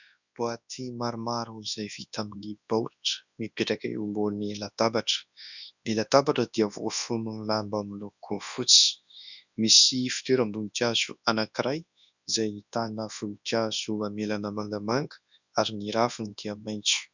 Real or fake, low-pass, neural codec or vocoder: fake; 7.2 kHz; codec, 24 kHz, 0.9 kbps, WavTokenizer, large speech release